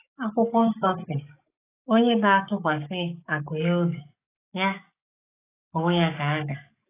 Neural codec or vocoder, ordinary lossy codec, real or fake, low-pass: codec, 44.1 kHz, 7.8 kbps, DAC; AAC, 16 kbps; fake; 3.6 kHz